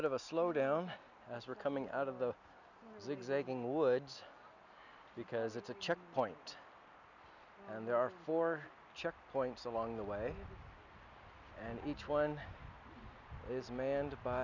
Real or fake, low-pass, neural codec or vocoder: real; 7.2 kHz; none